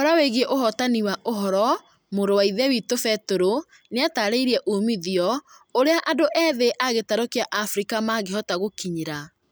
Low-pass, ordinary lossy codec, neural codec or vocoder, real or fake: none; none; none; real